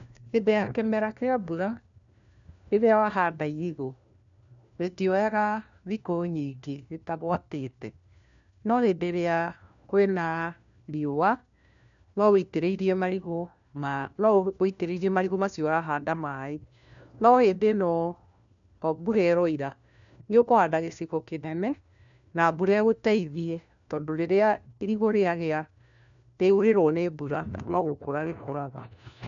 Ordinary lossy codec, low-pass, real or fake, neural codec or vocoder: none; 7.2 kHz; fake; codec, 16 kHz, 1 kbps, FunCodec, trained on LibriTTS, 50 frames a second